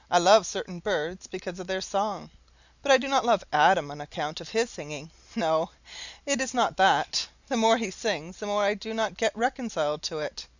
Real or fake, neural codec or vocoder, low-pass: real; none; 7.2 kHz